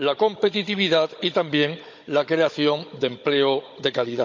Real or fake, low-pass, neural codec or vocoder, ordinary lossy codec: fake; 7.2 kHz; codec, 16 kHz, 16 kbps, FunCodec, trained on Chinese and English, 50 frames a second; MP3, 64 kbps